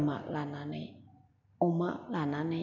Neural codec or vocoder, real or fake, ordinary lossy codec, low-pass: none; real; MP3, 48 kbps; 7.2 kHz